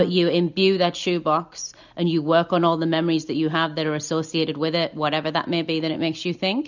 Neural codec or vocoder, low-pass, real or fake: none; 7.2 kHz; real